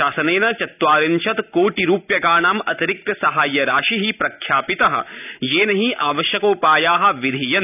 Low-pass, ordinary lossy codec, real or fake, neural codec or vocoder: 3.6 kHz; none; real; none